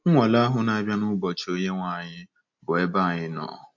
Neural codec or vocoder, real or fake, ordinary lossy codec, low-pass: none; real; MP3, 48 kbps; 7.2 kHz